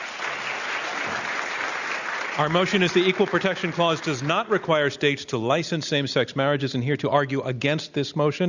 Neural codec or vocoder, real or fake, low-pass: none; real; 7.2 kHz